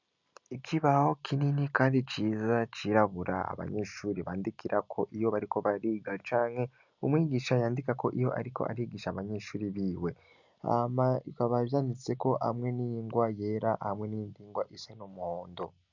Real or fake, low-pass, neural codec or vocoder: real; 7.2 kHz; none